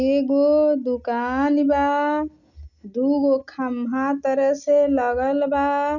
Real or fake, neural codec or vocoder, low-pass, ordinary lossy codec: real; none; 7.2 kHz; none